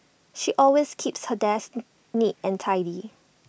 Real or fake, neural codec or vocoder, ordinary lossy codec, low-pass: real; none; none; none